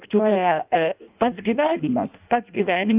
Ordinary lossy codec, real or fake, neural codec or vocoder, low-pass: Opus, 24 kbps; fake; codec, 16 kHz in and 24 kHz out, 0.6 kbps, FireRedTTS-2 codec; 3.6 kHz